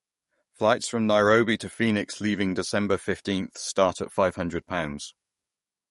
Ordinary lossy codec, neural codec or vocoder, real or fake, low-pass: MP3, 48 kbps; codec, 44.1 kHz, 7.8 kbps, DAC; fake; 19.8 kHz